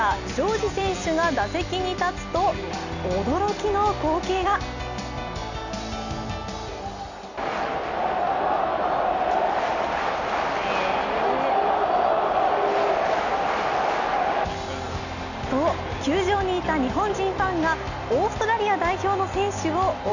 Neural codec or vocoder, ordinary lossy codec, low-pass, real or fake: none; none; 7.2 kHz; real